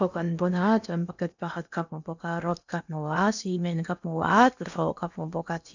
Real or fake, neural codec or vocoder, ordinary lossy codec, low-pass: fake; codec, 16 kHz in and 24 kHz out, 0.8 kbps, FocalCodec, streaming, 65536 codes; none; 7.2 kHz